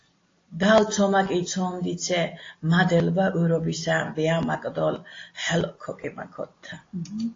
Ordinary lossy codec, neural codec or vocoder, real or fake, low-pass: AAC, 32 kbps; none; real; 7.2 kHz